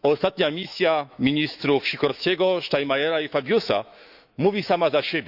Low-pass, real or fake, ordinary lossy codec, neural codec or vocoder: 5.4 kHz; fake; none; autoencoder, 48 kHz, 128 numbers a frame, DAC-VAE, trained on Japanese speech